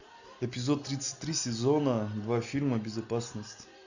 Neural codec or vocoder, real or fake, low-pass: none; real; 7.2 kHz